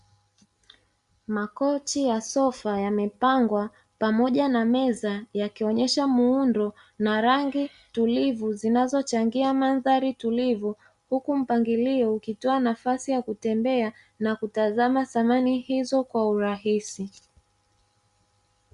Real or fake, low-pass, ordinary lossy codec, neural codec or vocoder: real; 10.8 kHz; Opus, 64 kbps; none